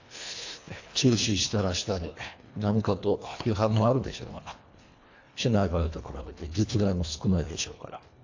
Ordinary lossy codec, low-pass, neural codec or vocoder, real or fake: AAC, 48 kbps; 7.2 kHz; codec, 24 kHz, 1.5 kbps, HILCodec; fake